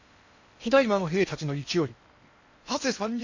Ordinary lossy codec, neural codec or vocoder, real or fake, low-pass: AAC, 48 kbps; codec, 16 kHz in and 24 kHz out, 0.8 kbps, FocalCodec, streaming, 65536 codes; fake; 7.2 kHz